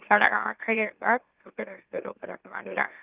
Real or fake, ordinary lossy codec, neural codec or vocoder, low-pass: fake; Opus, 32 kbps; autoencoder, 44.1 kHz, a latent of 192 numbers a frame, MeloTTS; 3.6 kHz